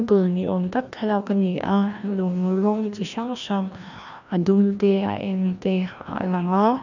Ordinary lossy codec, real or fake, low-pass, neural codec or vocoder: none; fake; 7.2 kHz; codec, 16 kHz, 1 kbps, FreqCodec, larger model